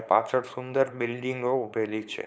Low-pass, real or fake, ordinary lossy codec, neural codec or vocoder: none; fake; none; codec, 16 kHz, 4.8 kbps, FACodec